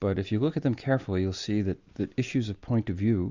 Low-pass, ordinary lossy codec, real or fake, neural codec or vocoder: 7.2 kHz; Opus, 64 kbps; real; none